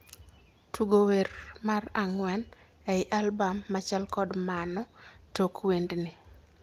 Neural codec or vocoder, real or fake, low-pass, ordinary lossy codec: none; real; 14.4 kHz; Opus, 32 kbps